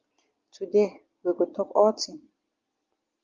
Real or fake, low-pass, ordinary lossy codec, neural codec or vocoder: real; 7.2 kHz; Opus, 32 kbps; none